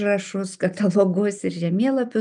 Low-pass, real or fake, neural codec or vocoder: 9.9 kHz; real; none